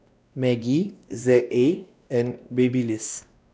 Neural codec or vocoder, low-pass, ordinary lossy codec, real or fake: codec, 16 kHz, 1 kbps, X-Codec, WavLM features, trained on Multilingual LibriSpeech; none; none; fake